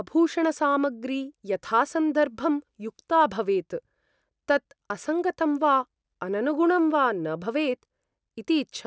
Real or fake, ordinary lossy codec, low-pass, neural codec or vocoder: real; none; none; none